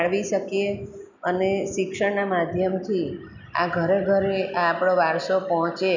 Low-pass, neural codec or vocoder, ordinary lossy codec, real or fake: 7.2 kHz; none; none; real